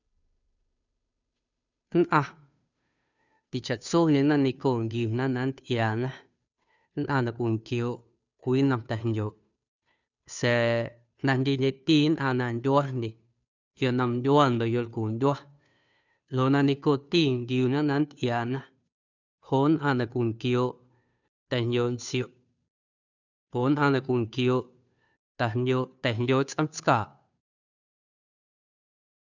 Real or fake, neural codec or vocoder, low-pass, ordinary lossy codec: fake; codec, 16 kHz, 2 kbps, FunCodec, trained on Chinese and English, 25 frames a second; 7.2 kHz; none